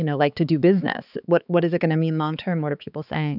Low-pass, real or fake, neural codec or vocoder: 5.4 kHz; fake; codec, 16 kHz, 4 kbps, X-Codec, HuBERT features, trained on balanced general audio